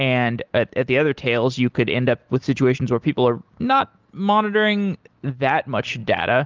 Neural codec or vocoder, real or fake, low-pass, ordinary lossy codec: none; real; 7.2 kHz; Opus, 32 kbps